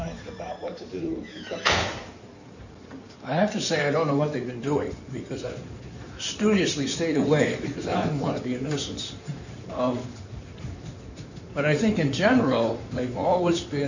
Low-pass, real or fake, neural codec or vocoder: 7.2 kHz; fake; codec, 16 kHz in and 24 kHz out, 2.2 kbps, FireRedTTS-2 codec